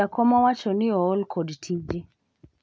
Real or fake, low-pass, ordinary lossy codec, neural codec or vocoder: real; none; none; none